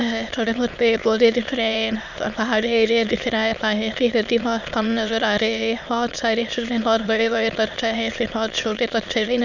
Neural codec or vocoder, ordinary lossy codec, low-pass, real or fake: autoencoder, 22.05 kHz, a latent of 192 numbers a frame, VITS, trained on many speakers; none; 7.2 kHz; fake